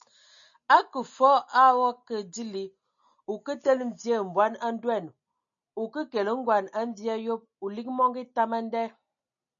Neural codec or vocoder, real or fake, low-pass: none; real; 7.2 kHz